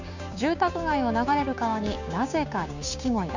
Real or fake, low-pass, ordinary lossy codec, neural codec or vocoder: fake; 7.2 kHz; none; codec, 16 kHz, 6 kbps, DAC